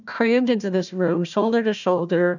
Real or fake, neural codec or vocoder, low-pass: fake; codec, 16 kHz, 1 kbps, FunCodec, trained on Chinese and English, 50 frames a second; 7.2 kHz